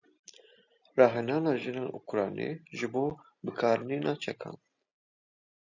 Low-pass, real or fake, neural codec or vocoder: 7.2 kHz; real; none